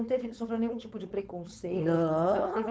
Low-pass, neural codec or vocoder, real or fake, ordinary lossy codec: none; codec, 16 kHz, 4.8 kbps, FACodec; fake; none